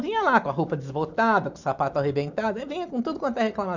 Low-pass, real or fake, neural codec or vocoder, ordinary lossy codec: 7.2 kHz; real; none; none